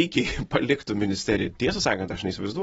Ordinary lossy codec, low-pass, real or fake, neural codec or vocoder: AAC, 24 kbps; 9.9 kHz; real; none